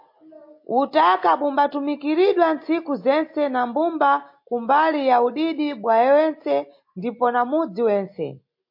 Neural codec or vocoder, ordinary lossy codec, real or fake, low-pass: none; MP3, 32 kbps; real; 5.4 kHz